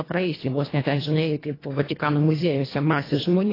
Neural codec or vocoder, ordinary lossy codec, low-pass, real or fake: codec, 24 kHz, 1.5 kbps, HILCodec; AAC, 24 kbps; 5.4 kHz; fake